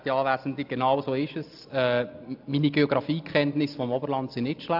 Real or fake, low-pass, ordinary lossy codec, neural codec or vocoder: real; 5.4 kHz; AAC, 48 kbps; none